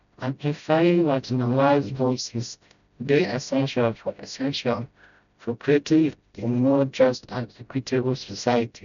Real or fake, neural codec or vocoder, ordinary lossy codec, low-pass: fake; codec, 16 kHz, 0.5 kbps, FreqCodec, smaller model; none; 7.2 kHz